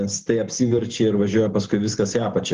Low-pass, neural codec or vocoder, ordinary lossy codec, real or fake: 7.2 kHz; none; Opus, 16 kbps; real